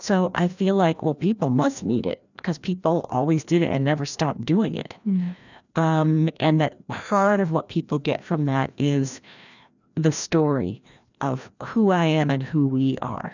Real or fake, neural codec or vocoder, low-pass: fake; codec, 16 kHz, 1 kbps, FreqCodec, larger model; 7.2 kHz